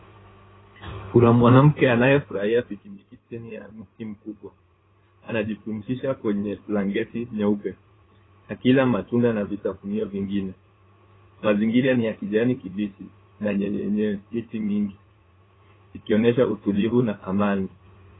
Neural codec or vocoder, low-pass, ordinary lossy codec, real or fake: codec, 16 kHz in and 24 kHz out, 2.2 kbps, FireRedTTS-2 codec; 7.2 kHz; AAC, 16 kbps; fake